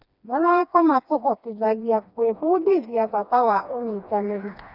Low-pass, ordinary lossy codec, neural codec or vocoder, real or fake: 5.4 kHz; none; codec, 16 kHz, 2 kbps, FreqCodec, smaller model; fake